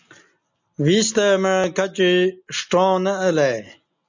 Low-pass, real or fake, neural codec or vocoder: 7.2 kHz; real; none